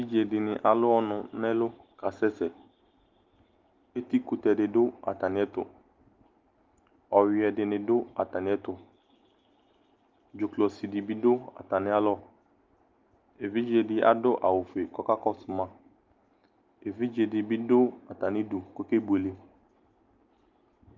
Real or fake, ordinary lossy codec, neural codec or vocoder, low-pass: real; Opus, 32 kbps; none; 7.2 kHz